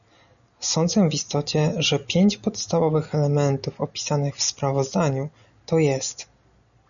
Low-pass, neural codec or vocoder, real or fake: 7.2 kHz; none; real